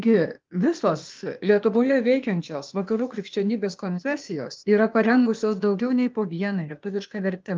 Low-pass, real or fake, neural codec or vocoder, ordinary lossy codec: 7.2 kHz; fake; codec, 16 kHz, 0.8 kbps, ZipCodec; Opus, 24 kbps